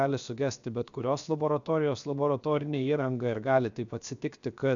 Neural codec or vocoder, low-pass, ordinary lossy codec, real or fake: codec, 16 kHz, 0.7 kbps, FocalCodec; 7.2 kHz; MP3, 96 kbps; fake